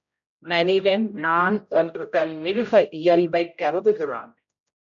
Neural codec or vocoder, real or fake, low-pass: codec, 16 kHz, 0.5 kbps, X-Codec, HuBERT features, trained on general audio; fake; 7.2 kHz